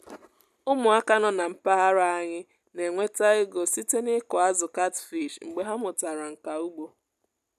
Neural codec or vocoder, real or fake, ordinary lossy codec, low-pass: none; real; none; 14.4 kHz